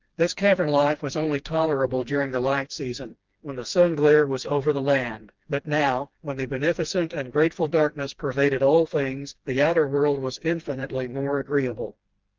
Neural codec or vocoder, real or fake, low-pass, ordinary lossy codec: codec, 16 kHz, 2 kbps, FreqCodec, smaller model; fake; 7.2 kHz; Opus, 32 kbps